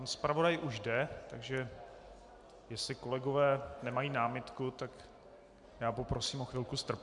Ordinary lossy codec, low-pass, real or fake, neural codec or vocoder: MP3, 96 kbps; 10.8 kHz; real; none